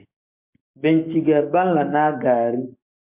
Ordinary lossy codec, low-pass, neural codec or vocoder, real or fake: MP3, 32 kbps; 3.6 kHz; codec, 44.1 kHz, 7.8 kbps, DAC; fake